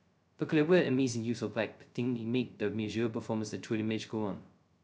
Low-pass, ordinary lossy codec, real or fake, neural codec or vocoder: none; none; fake; codec, 16 kHz, 0.2 kbps, FocalCodec